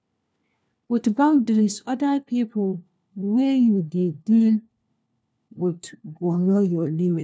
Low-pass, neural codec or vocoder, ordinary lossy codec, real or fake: none; codec, 16 kHz, 1 kbps, FunCodec, trained on LibriTTS, 50 frames a second; none; fake